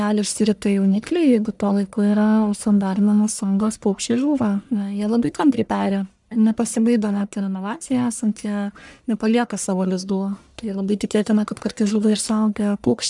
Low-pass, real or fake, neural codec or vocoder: 10.8 kHz; fake; codec, 44.1 kHz, 1.7 kbps, Pupu-Codec